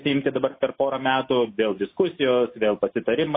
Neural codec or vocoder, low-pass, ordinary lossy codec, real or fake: none; 5.4 kHz; MP3, 24 kbps; real